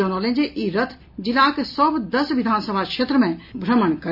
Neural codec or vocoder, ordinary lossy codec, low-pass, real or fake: none; none; 5.4 kHz; real